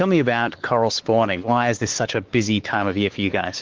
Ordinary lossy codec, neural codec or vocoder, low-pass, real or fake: Opus, 16 kbps; codec, 16 kHz, 4 kbps, X-Codec, WavLM features, trained on Multilingual LibriSpeech; 7.2 kHz; fake